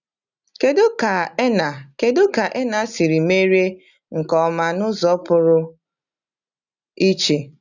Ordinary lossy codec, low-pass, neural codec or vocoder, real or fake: none; 7.2 kHz; none; real